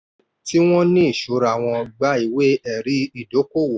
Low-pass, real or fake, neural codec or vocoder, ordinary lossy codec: none; real; none; none